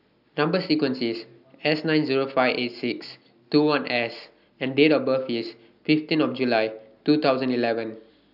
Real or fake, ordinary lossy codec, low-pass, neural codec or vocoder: real; none; 5.4 kHz; none